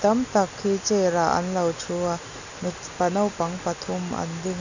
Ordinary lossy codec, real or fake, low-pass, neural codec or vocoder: none; real; 7.2 kHz; none